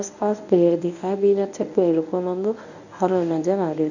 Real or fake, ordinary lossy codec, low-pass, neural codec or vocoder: fake; none; 7.2 kHz; codec, 24 kHz, 0.9 kbps, WavTokenizer, medium speech release version 1